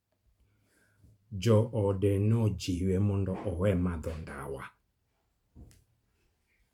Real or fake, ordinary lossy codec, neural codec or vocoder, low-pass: fake; MP3, 96 kbps; vocoder, 44.1 kHz, 128 mel bands every 512 samples, BigVGAN v2; 19.8 kHz